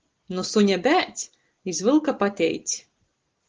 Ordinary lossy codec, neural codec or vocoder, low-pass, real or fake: Opus, 16 kbps; none; 7.2 kHz; real